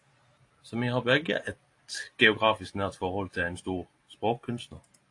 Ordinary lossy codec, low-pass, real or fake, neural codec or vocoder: AAC, 48 kbps; 10.8 kHz; real; none